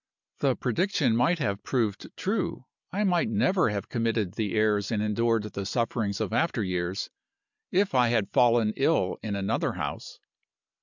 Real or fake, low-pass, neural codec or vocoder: real; 7.2 kHz; none